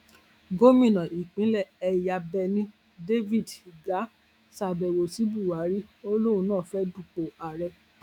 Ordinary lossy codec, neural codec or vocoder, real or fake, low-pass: none; autoencoder, 48 kHz, 128 numbers a frame, DAC-VAE, trained on Japanese speech; fake; 19.8 kHz